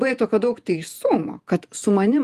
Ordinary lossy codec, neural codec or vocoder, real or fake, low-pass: Opus, 32 kbps; vocoder, 48 kHz, 128 mel bands, Vocos; fake; 14.4 kHz